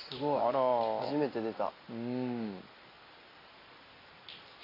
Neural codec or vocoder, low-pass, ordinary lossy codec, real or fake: none; 5.4 kHz; AAC, 24 kbps; real